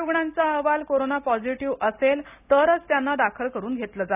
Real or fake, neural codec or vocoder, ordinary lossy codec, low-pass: real; none; none; 3.6 kHz